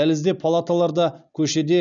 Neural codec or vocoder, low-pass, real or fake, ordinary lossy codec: none; 7.2 kHz; real; none